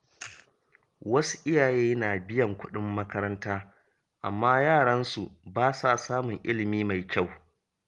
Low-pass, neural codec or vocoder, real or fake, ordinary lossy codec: 10.8 kHz; none; real; Opus, 24 kbps